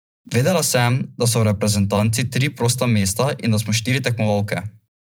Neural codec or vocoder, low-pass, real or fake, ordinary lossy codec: vocoder, 44.1 kHz, 128 mel bands every 512 samples, BigVGAN v2; none; fake; none